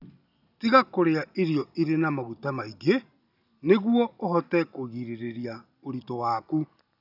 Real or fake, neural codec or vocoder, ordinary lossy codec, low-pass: real; none; none; 5.4 kHz